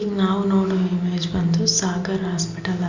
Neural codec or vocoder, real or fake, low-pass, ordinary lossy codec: none; real; 7.2 kHz; Opus, 64 kbps